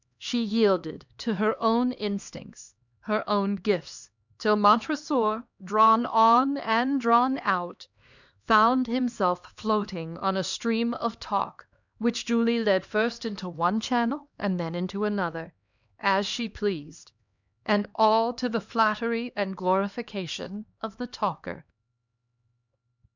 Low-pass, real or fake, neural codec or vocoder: 7.2 kHz; fake; codec, 16 kHz, 2 kbps, X-Codec, HuBERT features, trained on LibriSpeech